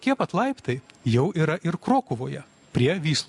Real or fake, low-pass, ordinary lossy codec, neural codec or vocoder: real; 10.8 kHz; MP3, 64 kbps; none